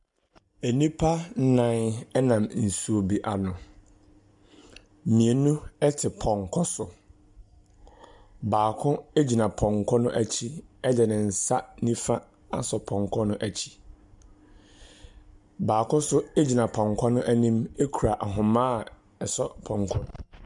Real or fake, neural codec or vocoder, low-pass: real; none; 10.8 kHz